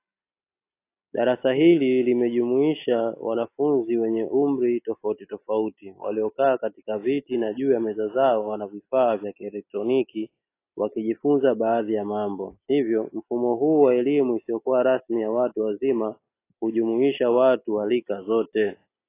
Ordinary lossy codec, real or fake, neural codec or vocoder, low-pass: AAC, 24 kbps; real; none; 3.6 kHz